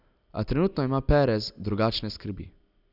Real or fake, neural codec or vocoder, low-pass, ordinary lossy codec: real; none; 5.4 kHz; none